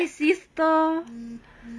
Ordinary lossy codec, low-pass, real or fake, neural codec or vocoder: none; none; real; none